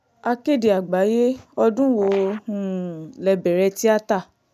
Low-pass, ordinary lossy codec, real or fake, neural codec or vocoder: 14.4 kHz; none; real; none